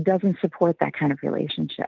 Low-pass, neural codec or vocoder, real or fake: 7.2 kHz; none; real